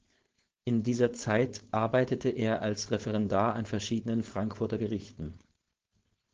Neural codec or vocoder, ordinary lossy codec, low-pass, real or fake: codec, 16 kHz, 4.8 kbps, FACodec; Opus, 16 kbps; 7.2 kHz; fake